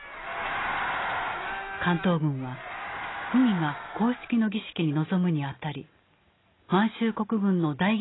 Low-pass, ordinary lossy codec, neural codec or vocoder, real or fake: 7.2 kHz; AAC, 16 kbps; none; real